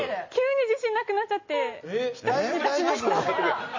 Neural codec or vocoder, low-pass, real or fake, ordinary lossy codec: none; 7.2 kHz; real; MP3, 32 kbps